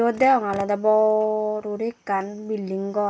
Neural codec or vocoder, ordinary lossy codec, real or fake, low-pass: none; none; real; none